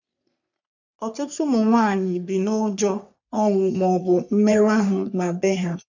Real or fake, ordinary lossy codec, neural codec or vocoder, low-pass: fake; none; codec, 44.1 kHz, 3.4 kbps, Pupu-Codec; 7.2 kHz